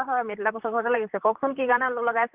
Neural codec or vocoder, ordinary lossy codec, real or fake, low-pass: vocoder, 44.1 kHz, 128 mel bands, Pupu-Vocoder; Opus, 32 kbps; fake; 3.6 kHz